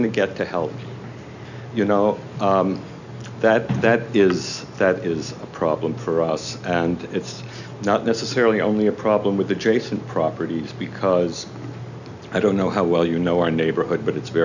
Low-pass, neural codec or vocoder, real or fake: 7.2 kHz; none; real